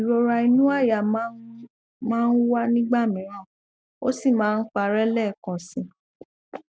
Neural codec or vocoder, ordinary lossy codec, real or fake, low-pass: none; none; real; none